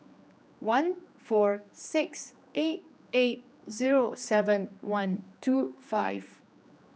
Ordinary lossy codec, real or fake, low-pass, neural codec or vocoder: none; fake; none; codec, 16 kHz, 2 kbps, X-Codec, HuBERT features, trained on general audio